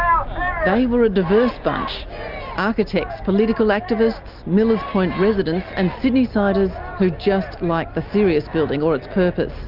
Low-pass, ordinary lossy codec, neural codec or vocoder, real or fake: 5.4 kHz; Opus, 24 kbps; none; real